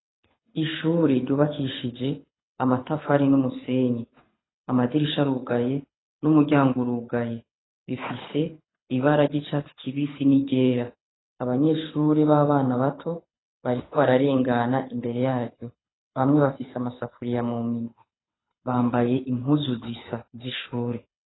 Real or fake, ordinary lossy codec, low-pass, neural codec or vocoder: fake; AAC, 16 kbps; 7.2 kHz; codec, 24 kHz, 6 kbps, HILCodec